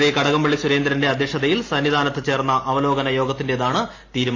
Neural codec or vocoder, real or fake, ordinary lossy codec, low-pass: none; real; AAC, 32 kbps; 7.2 kHz